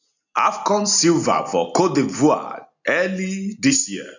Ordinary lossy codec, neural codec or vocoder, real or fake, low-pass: none; none; real; 7.2 kHz